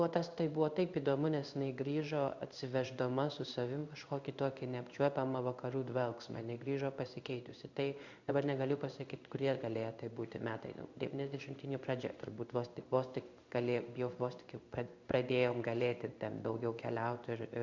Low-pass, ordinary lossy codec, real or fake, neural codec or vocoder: 7.2 kHz; Opus, 64 kbps; fake; codec, 16 kHz in and 24 kHz out, 1 kbps, XY-Tokenizer